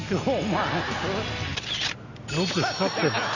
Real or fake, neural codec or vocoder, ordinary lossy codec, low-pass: real; none; none; 7.2 kHz